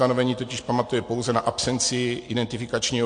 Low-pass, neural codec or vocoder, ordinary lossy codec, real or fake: 9.9 kHz; none; MP3, 48 kbps; real